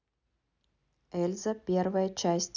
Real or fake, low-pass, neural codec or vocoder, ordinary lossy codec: real; 7.2 kHz; none; none